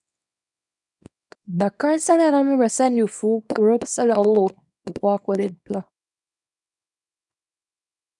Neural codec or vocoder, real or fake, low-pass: codec, 24 kHz, 0.9 kbps, WavTokenizer, small release; fake; 10.8 kHz